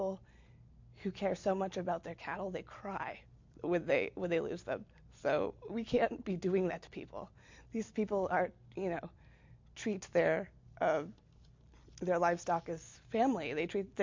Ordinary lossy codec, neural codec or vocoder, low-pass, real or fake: MP3, 48 kbps; none; 7.2 kHz; real